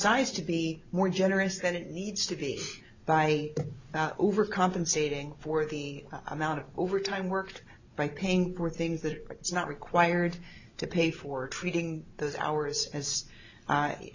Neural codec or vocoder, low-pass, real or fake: none; 7.2 kHz; real